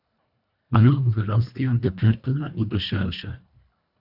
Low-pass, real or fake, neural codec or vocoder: 5.4 kHz; fake; codec, 24 kHz, 1.5 kbps, HILCodec